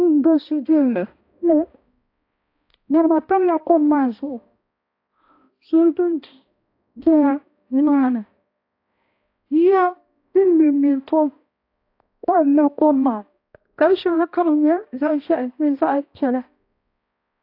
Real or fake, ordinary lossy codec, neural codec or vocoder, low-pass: fake; AAC, 32 kbps; codec, 16 kHz, 1 kbps, X-Codec, HuBERT features, trained on balanced general audio; 5.4 kHz